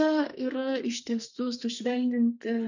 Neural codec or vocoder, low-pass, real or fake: codec, 32 kHz, 1.9 kbps, SNAC; 7.2 kHz; fake